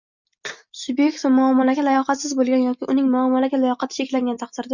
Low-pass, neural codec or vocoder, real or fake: 7.2 kHz; none; real